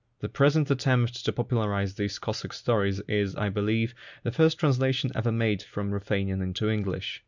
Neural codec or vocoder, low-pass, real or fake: none; 7.2 kHz; real